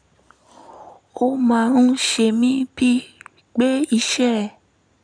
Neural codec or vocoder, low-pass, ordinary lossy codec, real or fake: none; 9.9 kHz; none; real